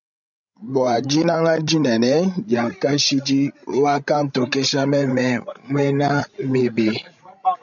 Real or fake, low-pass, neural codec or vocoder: fake; 7.2 kHz; codec, 16 kHz, 16 kbps, FreqCodec, larger model